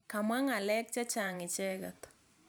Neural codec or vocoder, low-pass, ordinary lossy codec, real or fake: vocoder, 44.1 kHz, 128 mel bands every 512 samples, BigVGAN v2; none; none; fake